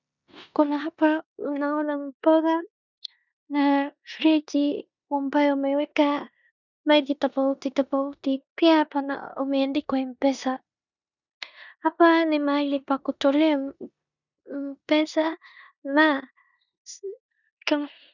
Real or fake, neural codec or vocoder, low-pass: fake; codec, 16 kHz in and 24 kHz out, 0.9 kbps, LongCat-Audio-Codec, four codebook decoder; 7.2 kHz